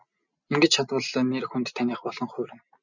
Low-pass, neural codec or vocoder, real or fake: 7.2 kHz; none; real